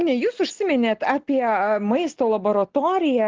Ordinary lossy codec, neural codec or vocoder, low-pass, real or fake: Opus, 16 kbps; none; 7.2 kHz; real